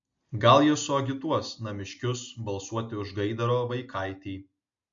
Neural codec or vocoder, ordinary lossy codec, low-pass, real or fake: none; MP3, 48 kbps; 7.2 kHz; real